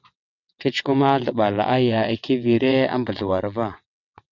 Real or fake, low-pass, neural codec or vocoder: fake; 7.2 kHz; vocoder, 22.05 kHz, 80 mel bands, WaveNeXt